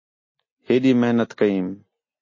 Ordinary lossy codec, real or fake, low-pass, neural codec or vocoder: MP3, 32 kbps; real; 7.2 kHz; none